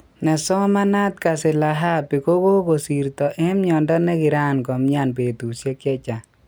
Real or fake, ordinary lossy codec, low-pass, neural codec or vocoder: real; none; none; none